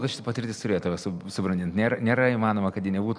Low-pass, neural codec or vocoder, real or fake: 9.9 kHz; none; real